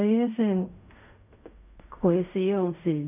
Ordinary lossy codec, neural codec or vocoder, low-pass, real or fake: none; codec, 16 kHz in and 24 kHz out, 0.4 kbps, LongCat-Audio-Codec, fine tuned four codebook decoder; 3.6 kHz; fake